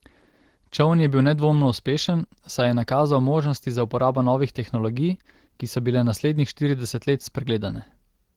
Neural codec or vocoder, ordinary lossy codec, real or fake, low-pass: none; Opus, 16 kbps; real; 19.8 kHz